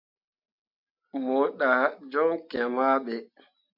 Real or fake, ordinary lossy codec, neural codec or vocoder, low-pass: real; MP3, 48 kbps; none; 5.4 kHz